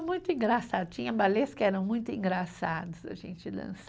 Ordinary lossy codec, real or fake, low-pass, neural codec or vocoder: none; real; none; none